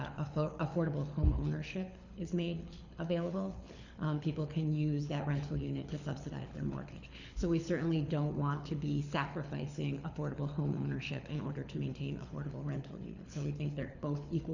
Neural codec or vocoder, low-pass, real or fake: codec, 24 kHz, 6 kbps, HILCodec; 7.2 kHz; fake